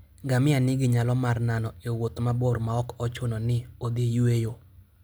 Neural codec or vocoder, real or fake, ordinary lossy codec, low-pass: none; real; none; none